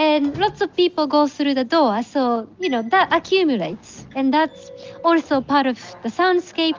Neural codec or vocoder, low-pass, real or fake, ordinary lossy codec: none; 7.2 kHz; real; Opus, 32 kbps